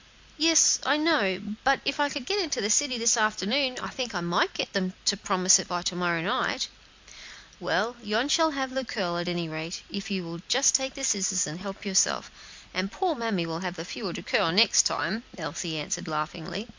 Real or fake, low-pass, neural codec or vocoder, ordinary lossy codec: real; 7.2 kHz; none; MP3, 64 kbps